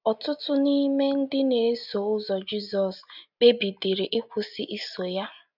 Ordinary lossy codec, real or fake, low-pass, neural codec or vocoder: none; real; 5.4 kHz; none